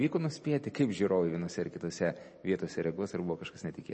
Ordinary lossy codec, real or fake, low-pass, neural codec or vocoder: MP3, 32 kbps; real; 10.8 kHz; none